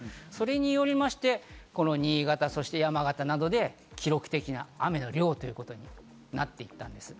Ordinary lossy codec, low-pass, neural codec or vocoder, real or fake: none; none; none; real